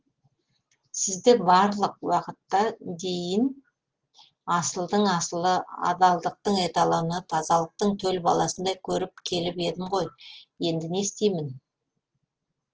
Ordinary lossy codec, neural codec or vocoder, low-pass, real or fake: Opus, 16 kbps; none; 7.2 kHz; real